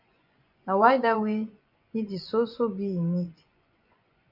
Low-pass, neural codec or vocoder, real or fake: 5.4 kHz; vocoder, 24 kHz, 100 mel bands, Vocos; fake